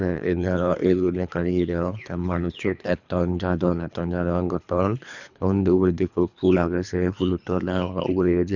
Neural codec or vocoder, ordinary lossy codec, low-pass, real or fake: codec, 24 kHz, 3 kbps, HILCodec; none; 7.2 kHz; fake